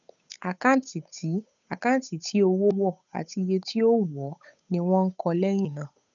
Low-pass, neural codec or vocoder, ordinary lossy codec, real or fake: 7.2 kHz; codec, 16 kHz, 8 kbps, FunCodec, trained on Chinese and English, 25 frames a second; none; fake